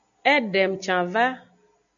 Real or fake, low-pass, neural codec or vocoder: real; 7.2 kHz; none